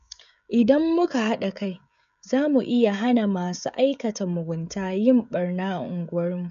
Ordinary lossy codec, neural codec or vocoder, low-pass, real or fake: none; codec, 16 kHz, 16 kbps, FreqCodec, smaller model; 7.2 kHz; fake